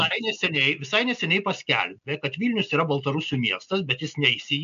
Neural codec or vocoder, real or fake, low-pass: none; real; 7.2 kHz